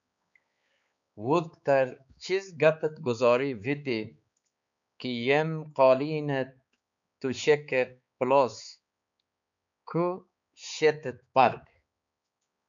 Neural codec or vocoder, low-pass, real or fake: codec, 16 kHz, 4 kbps, X-Codec, HuBERT features, trained on balanced general audio; 7.2 kHz; fake